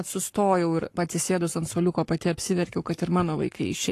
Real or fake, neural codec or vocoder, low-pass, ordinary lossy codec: fake; codec, 44.1 kHz, 7.8 kbps, Pupu-Codec; 14.4 kHz; AAC, 48 kbps